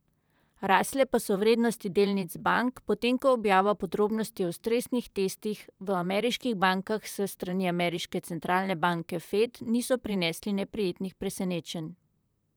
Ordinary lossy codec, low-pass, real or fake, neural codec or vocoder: none; none; fake; vocoder, 44.1 kHz, 128 mel bands, Pupu-Vocoder